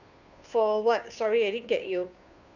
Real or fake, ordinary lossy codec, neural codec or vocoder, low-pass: fake; none; codec, 16 kHz, 2 kbps, FunCodec, trained on Chinese and English, 25 frames a second; 7.2 kHz